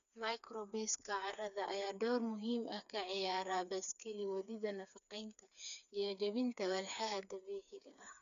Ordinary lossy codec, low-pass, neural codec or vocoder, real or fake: none; 7.2 kHz; codec, 16 kHz, 4 kbps, FreqCodec, smaller model; fake